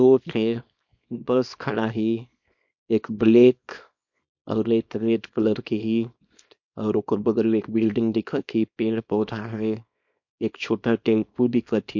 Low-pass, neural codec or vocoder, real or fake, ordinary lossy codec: 7.2 kHz; codec, 24 kHz, 0.9 kbps, WavTokenizer, small release; fake; MP3, 48 kbps